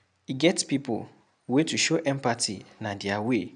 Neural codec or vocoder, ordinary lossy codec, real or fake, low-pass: none; none; real; 9.9 kHz